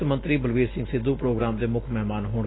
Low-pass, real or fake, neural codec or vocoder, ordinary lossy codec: 7.2 kHz; real; none; AAC, 16 kbps